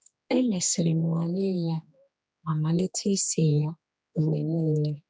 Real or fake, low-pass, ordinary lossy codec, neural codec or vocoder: fake; none; none; codec, 16 kHz, 2 kbps, X-Codec, HuBERT features, trained on general audio